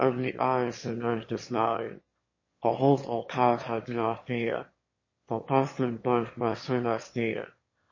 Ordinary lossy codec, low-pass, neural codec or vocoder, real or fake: MP3, 32 kbps; 7.2 kHz; autoencoder, 22.05 kHz, a latent of 192 numbers a frame, VITS, trained on one speaker; fake